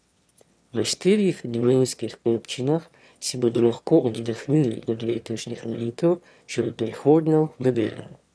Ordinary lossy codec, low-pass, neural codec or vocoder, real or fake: none; none; autoencoder, 22.05 kHz, a latent of 192 numbers a frame, VITS, trained on one speaker; fake